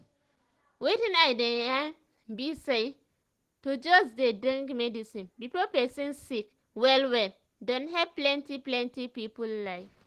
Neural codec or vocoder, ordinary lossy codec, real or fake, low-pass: none; Opus, 16 kbps; real; 14.4 kHz